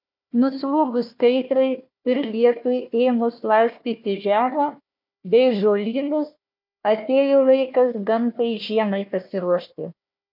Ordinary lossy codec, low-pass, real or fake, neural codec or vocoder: MP3, 48 kbps; 5.4 kHz; fake; codec, 16 kHz, 1 kbps, FunCodec, trained on Chinese and English, 50 frames a second